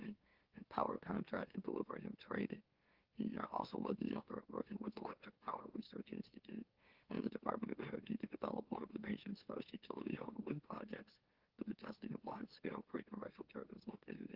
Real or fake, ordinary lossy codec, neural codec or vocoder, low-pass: fake; Opus, 16 kbps; autoencoder, 44.1 kHz, a latent of 192 numbers a frame, MeloTTS; 5.4 kHz